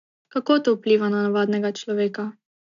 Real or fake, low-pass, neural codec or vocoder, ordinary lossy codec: real; 7.2 kHz; none; none